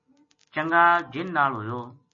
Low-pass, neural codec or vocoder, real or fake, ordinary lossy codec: 7.2 kHz; none; real; MP3, 32 kbps